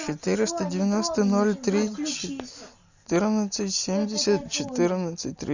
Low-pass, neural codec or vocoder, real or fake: 7.2 kHz; none; real